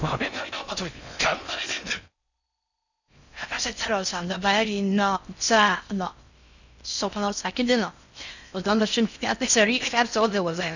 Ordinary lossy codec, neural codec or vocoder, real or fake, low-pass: none; codec, 16 kHz in and 24 kHz out, 0.6 kbps, FocalCodec, streaming, 4096 codes; fake; 7.2 kHz